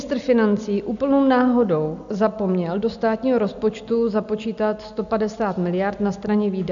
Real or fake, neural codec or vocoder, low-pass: real; none; 7.2 kHz